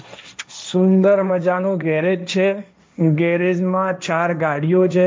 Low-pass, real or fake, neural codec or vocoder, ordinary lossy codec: none; fake; codec, 16 kHz, 1.1 kbps, Voila-Tokenizer; none